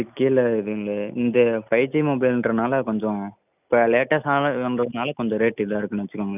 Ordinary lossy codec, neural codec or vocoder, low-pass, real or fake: none; none; 3.6 kHz; real